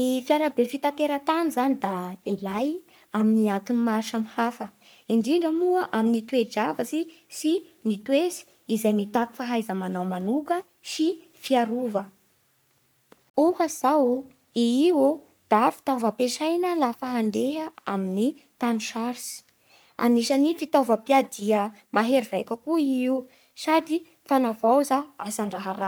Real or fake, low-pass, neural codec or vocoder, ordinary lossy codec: fake; none; codec, 44.1 kHz, 3.4 kbps, Pupu-Codec; none